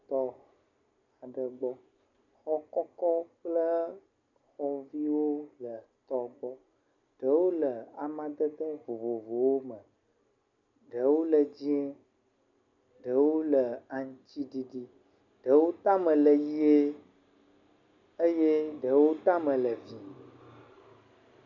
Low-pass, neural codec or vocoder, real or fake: 7.2 kHz; none; real